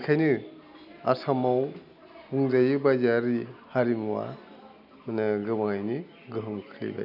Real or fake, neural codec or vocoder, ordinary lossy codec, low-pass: real; none; none; 5.4 kHz